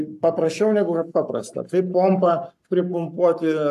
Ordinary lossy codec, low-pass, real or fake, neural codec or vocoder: AAC, 96 kbps; 14.4 kHz; fake; codec, 44.1 kHz, 7.8 kbps, Pupu-Codec